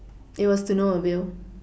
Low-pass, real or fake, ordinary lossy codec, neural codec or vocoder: none; real; none; none